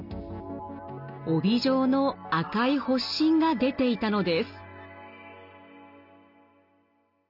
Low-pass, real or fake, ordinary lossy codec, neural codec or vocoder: 5.4 kHz; real; none; none